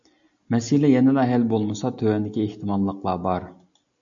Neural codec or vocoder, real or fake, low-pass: none; real; 7.2 kHz